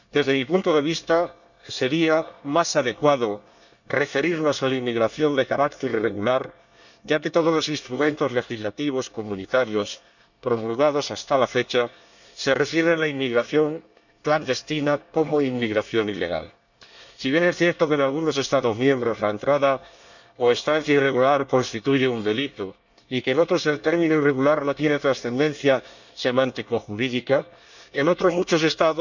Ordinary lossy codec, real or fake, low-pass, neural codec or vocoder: none; fake; 7.2 kHz; codec, 24 kHz, 1 kbps, SNAC